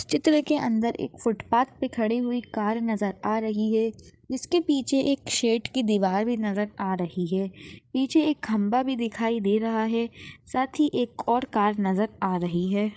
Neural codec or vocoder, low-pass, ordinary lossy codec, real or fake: codec, 16 kHz, 8 kbps, FreqCodec, larger model; none; none; fake